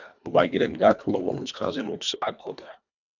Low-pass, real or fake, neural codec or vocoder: 7.2 kHz; fake; codec, 24 kHz, 1.5 kbps, HILCodec